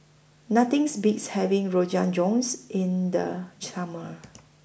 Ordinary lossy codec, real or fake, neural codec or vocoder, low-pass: none; real; none; none